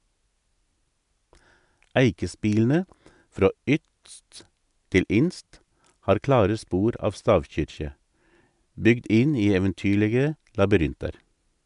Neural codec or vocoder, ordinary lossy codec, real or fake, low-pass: none; none; real; 10.8 kHz